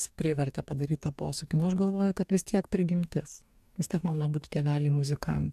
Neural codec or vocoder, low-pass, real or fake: codec, 44.1 kHz, 2.6 kbps, DAC; 14.4 kHz; fake